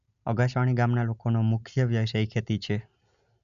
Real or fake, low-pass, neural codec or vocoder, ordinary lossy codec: real; 7.2 kHz; none; none